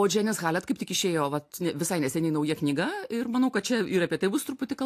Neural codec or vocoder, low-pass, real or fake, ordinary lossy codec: none; 14.4 kHz; real; AAC, 64 kbps